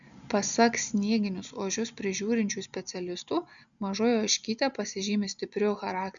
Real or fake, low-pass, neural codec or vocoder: real; 7.2 kHz; none